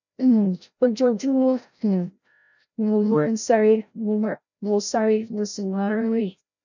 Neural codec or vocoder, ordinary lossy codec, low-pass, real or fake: codec, 16 kHz, 0.5 kbps, FreqCodec, larger model; none; 7.2 kHz; fake